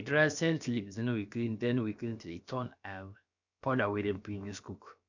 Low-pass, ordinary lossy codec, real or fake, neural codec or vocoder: 7.2 kHz; none; fake; codec, 16 kHz, about 1 kbps, DyCAST, with the encoder's durations